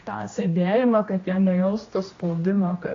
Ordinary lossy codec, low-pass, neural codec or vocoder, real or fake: AAC, 32 kbps; 7.2 kHz; codec, 16 kHz, 1 kbps, X-Codec, HuBERT features, trained on general audio; fake